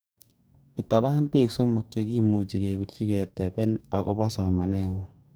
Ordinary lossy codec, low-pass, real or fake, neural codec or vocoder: none; none; fake; codec, 44.1 kHz, 2.6 kbps, DAC